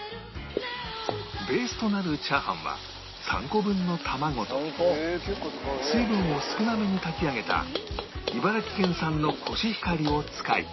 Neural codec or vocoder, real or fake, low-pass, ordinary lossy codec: none; real; 7.2 kHz; MP3, 24 kbps